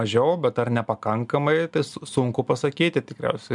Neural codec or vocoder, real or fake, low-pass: none; real; 10.8 kHz